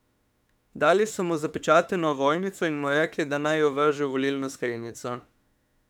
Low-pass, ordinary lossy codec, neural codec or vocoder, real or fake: 19.8 kHz; none; autoencoder, 48 kHz, 32 numbers a frame, DAC-VAE, trained on Japanese speech; fake